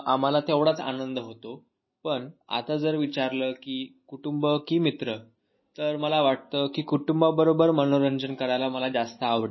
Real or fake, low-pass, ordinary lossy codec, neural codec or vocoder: real; 7.2 kHz; MP3, 24 kbps; none